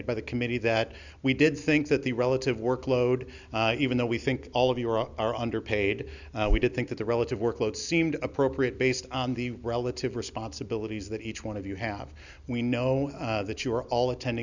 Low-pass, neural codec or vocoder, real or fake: 7.2 kHz; none; real